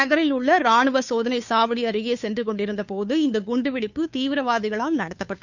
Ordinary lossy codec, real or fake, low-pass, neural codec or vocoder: none; fake; 7.2 kHz; codec, 16 kHz, 2 kbps, FunCodec, trained on Chinese and English, 25 frames a second